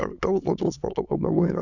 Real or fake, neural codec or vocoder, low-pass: fake; autoencoder, 22.05 kHz, a latent of 192 numbers a frame, VITS, trained on many speakers; 7.2 kHz